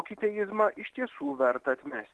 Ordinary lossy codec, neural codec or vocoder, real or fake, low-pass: Opus, 16 kbps; none; real; 10.8 kHz